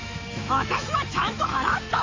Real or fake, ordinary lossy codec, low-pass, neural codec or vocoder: fake; AAC, 32 kbps; 7.2 kHz; autoencoder, 48 kHz, 128 numbers a frame, DAC-VAE, trained on Japanese speech